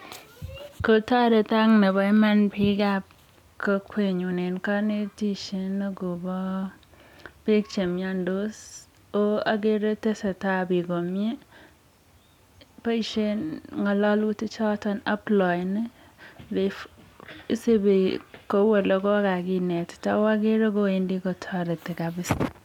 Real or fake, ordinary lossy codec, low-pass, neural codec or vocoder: real; none; 19.8 kHz; none